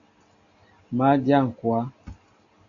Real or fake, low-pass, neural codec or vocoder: real; 7.2 kHz; none